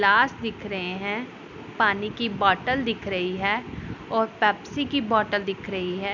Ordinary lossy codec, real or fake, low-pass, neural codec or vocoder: none; real; 7.2 kHz; none